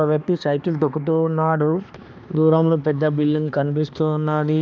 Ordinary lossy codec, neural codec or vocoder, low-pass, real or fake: none; codec, 16 kHz, 2 kbps, X-Codec, HuBERT features, trained on balanced general audio; none; fake